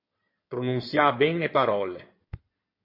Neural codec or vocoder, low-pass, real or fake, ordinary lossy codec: codec, 16 kHz in and 24 kHz out, 2.2 kbps, FireRedTTS-2 codec; 5.4 kHz; fake; MP3, 32 kbps